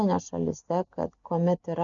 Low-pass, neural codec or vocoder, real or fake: 7.2 kHz; none; real